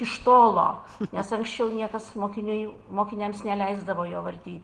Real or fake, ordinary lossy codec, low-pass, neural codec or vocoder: real; Opus, 16 kbps; 10.8 kHz; none